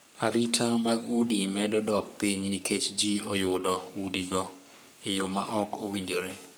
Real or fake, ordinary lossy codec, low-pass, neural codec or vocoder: fake; none; none; codec, 44.1 kHz, 3.4 kbps, Pupu-Codec